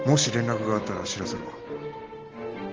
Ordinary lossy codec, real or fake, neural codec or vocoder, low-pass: Opus, 16 kbps; real; none; 7.2 kHz